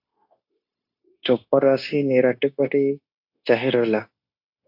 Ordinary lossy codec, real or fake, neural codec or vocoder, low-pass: AAC, 48 kbps; fake; codec, 16 kHz, 0.9 kbps, LongCat-Audio-Codec; 5.4 kHz